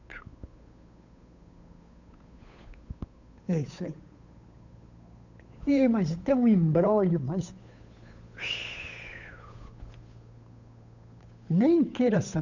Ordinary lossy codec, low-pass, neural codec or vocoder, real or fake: none; 7.2 kHz; codec, 16 kHz, 8 kbps, FunCodec, trained on Chinese and English, 25 frames a second; fake